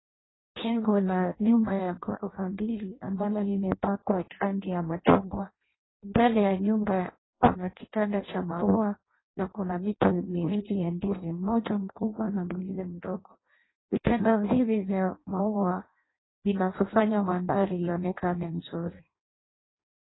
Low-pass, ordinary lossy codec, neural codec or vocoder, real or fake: 7.2 kHz; AAC, 16 kbps; codec, 16 kHz in and 24 kHz out, 0.6 kbps, FireRedTTS-2 codec; fake